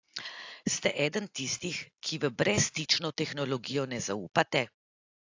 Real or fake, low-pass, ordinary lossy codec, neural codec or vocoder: real; 7.2 kHz; AAC, 48 kbps; none